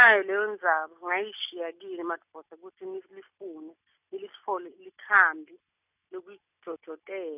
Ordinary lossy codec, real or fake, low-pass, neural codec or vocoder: none; real; 3.6 kHz; none